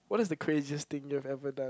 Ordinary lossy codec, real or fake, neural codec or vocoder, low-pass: none; real; none; none